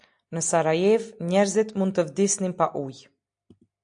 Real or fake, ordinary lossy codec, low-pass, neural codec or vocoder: real; AAC, 64 kbps; 9.9 kHz; none